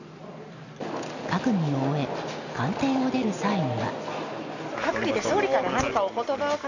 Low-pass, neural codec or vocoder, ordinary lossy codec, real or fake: 7.2 kHz; none; none; real